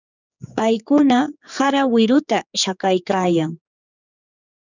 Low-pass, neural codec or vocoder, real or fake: 7.2 kHz; codec, 16 kHz, 4 kbps, X-Codec, HuBERT features, trained on general audio; fake